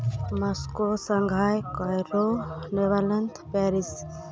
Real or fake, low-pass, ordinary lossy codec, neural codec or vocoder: real; none; none; none